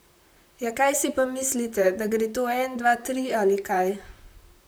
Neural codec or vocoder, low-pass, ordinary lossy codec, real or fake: vocoder, 44.1 kHz, 128 mel bands, Pupu-Vocoder; none; none; fake